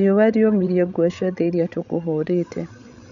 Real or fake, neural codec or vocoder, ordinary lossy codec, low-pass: fake; codec, 16 kHz, 16 kbps, FreqCodec, larger model; none; 7.2 kHz